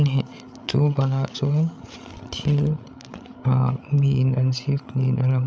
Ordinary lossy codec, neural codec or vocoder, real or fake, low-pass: none; codec, 16 kHz, 8 kbps, FreqCodec, larger model; fake; none